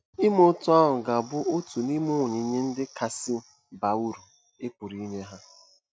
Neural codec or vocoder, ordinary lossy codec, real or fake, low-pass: none; none; real; none